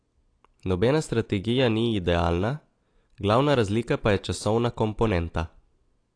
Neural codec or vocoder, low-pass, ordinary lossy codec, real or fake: none; 9.9 kHz; AAC, 48 kbps; real